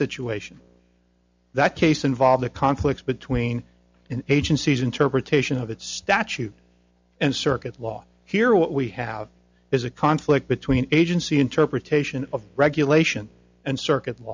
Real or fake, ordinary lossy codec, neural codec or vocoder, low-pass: real; MP3, 64 kbps; none; 7.2 kHz